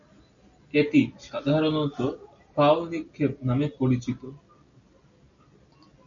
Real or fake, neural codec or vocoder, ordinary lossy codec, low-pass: real; none; MP3, 96 kbps; 7.2 kHz